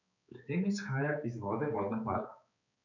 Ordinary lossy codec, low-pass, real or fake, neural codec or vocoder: none; 7.2 kHz; fake; codec, 16 kHz, 4 kbps, X-Codec, HuBERT features, trained on balanced general audio